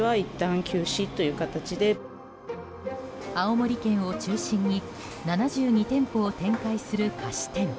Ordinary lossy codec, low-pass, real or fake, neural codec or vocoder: none; none; real; none